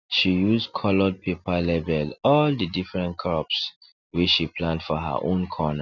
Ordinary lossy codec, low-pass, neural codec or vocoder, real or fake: none; 7.2 kHz; none; real